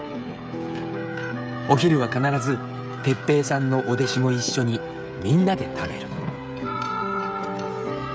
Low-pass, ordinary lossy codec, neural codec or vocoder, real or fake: none; none; codec, 16 kHz, 16 kbps, FreqCodec, smaller model; fake